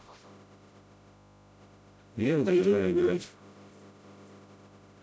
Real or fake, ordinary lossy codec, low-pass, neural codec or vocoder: fake; none; none; codec, 16 kHz, 0.5 kbps, FreqCodec, smaller model